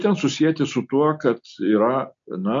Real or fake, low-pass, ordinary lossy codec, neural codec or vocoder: real; 7.2 kHz; MP3, 48 kbps; none